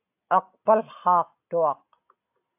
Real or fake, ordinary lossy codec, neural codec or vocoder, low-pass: real; AAC, 32 kbps; none; 3.6 kHz